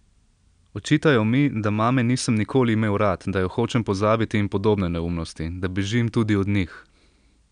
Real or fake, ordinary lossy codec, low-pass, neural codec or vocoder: real; none; 9.9 kHz; none